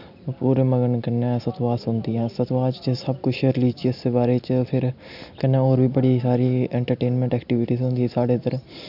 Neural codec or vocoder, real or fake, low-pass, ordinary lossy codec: none; real; 5.4 kHz; none